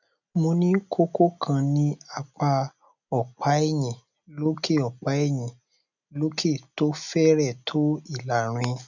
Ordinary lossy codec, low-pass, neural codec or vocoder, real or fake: none; 7.2 kHz; none; real